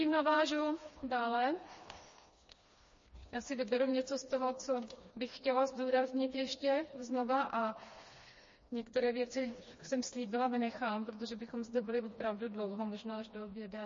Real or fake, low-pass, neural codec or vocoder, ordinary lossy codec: fake; 7.2 kHz; codec, 16 kHz, 2 kbps, FreqCodec, smaller model; MP3, 32 kbps